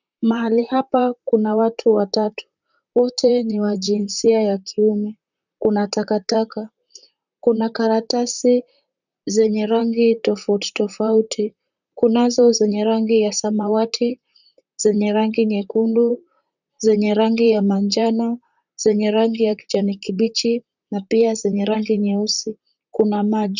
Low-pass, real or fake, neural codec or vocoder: 7.2 kHz; fake; vocoder, 44.1 kHz, 128 mel bands, Pupu-Vocoder